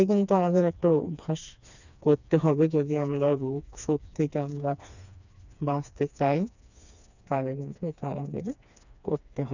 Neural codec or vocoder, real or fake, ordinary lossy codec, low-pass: codec, 16 kHz, 2 kbps, FreqCodec, smaller model; fake; none; 7.2 kHz